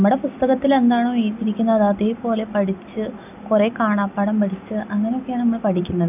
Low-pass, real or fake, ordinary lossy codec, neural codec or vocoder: 3.6 kHz; real; none; none